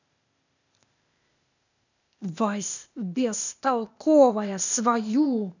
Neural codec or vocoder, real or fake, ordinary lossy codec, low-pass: codec, 16 kHz, 0.8 kbps, ZipCodec; fake; none; 7.2 kHz